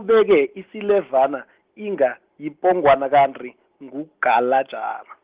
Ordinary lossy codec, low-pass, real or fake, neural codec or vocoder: Opus, 16 kbps; 3.6 kHz; real; none